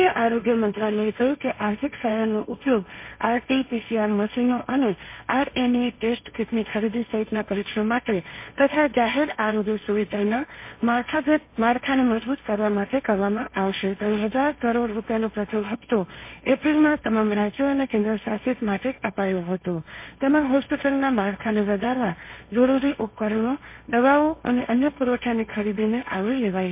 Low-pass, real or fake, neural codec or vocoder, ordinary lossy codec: 3.6 kHz; fake; codec, 16 kHz, 1.1 kbps, Voila-Tokenizer; MP3, 24 kbps